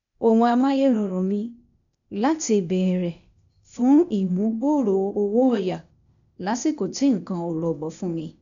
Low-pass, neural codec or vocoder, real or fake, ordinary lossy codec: 7.2 kHz; codec, 16 kHz, 0.8 kbps, ZipCodec; fake; none